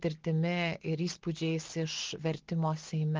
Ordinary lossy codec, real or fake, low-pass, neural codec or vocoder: Opus, 16 kbps; real; 7.2 kHz; none